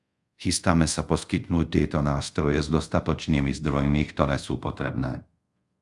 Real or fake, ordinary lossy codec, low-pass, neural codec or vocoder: fake; Opus, 64 kbps; 10.8 kHz; codec, 24 kHz, 0.5 kbps, DualCodec